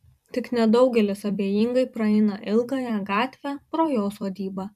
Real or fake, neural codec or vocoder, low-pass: fake; vocoder, 44.1 kHz, 128 mel bands every 256 samples, BigVGAN v2; 14.4 kHz